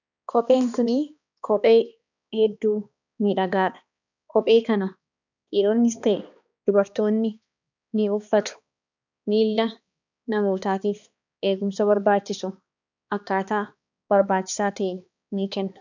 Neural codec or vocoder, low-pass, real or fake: codec, 16 kHz, 2 kbps, X-Codec, HuBERT features, trained on balanced general audio; 7.2 kHz; fake